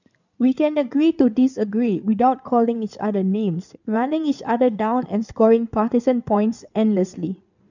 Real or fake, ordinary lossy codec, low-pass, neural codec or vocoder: fake; none; 7.2 kHz; codec, 16 kHz in and 24 kHz out, 2.2 kbps, FireRedTTS-2 codec